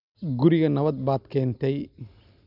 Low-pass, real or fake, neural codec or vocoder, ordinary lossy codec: 5.4 kHz; real; none; none